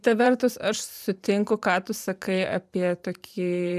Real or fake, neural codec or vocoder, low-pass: fake; vocoder, 44.1 kHz, 128 mel bands every 512 samples, BigVGAN v2; 14.4 kHz